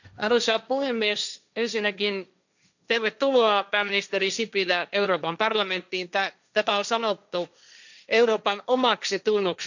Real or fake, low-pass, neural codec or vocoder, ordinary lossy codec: fake; 7.2 kHz; codec, 16 kHz, 1.1 kbps, Voila-Tokenizer; none